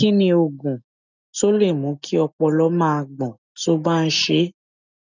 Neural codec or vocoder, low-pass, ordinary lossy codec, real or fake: none; 7.2 kHz; none; real